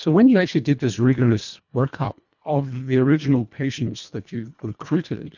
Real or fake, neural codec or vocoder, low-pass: fake; codec, 24 kHz, 1.5 kbps, HILCodec; 7.2 kHz